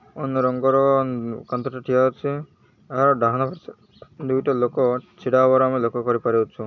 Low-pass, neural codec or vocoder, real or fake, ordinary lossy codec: 7.2 kHz; none; real; none